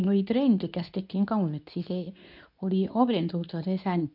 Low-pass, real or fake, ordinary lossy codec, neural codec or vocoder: 5.4 kHz; fake; none; codec, 24 kHz, 0.9 kbps, WavTokenizer, medium speech release version 2